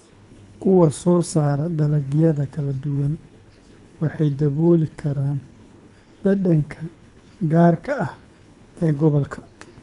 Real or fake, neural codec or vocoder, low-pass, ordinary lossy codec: fake; codec, 24 kHz, 3 kbps, HILCodec; 10.8 kHz; none